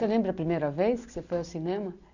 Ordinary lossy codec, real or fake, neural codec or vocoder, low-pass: none; real; none; 7.2 kHz